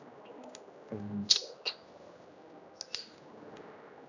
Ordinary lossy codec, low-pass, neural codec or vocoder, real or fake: none; 7.2 kHz; codec, 16 kHz, 1 kbps, X-Codec, HuBERT features, trained on general audio; fake